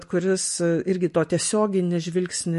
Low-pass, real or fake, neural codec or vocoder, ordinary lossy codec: 14.4 kHz; real; none; MP3, 48 kbps